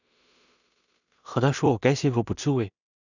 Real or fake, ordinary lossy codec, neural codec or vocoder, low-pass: fake; none; codec, 16 kHz in and 24 kHz out, 0.4 kbps, LongCat-Audio-Codec, two codebook decoder; 7.2 kHz